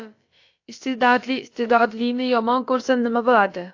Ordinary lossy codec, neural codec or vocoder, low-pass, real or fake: AAC, 48 kbps; codec, 16 kHz, about 1 kbps, DyCAST, with the encoder's durations; 7.2 kHz; fake